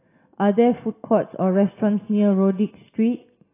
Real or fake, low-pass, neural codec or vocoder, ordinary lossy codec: real; 3.6 kHz; none; AAC, 16 kbps